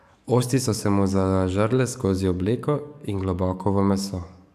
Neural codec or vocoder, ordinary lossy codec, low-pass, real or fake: codec, 44.1 kHz, 7.8 kbps, DAC; none; 14.4 kHz; fake